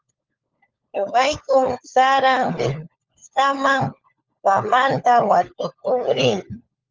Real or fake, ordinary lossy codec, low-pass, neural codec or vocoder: fake; Opus, 24 kbps; 7.2 kHz; codec, 16 kHz, 4 kbps, FunCodec, trained on LibriTTS, 50 frames a second